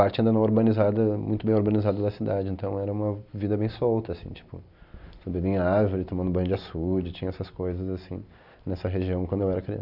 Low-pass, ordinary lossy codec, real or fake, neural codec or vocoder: 5.4 kHz; none; real; none